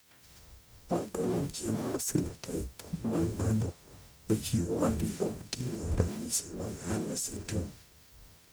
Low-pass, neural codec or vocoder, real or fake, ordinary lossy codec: none; codec, 44.1 kHz, 0.9 kbps, DAC; fake; none